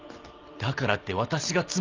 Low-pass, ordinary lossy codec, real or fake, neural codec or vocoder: 7.2 kHz; Opus, 24 kbps; real; none